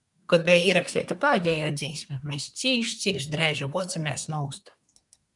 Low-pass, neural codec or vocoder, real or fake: 10.8 kHz; codec, 24 kHz, 1 kbps, SNAC; fake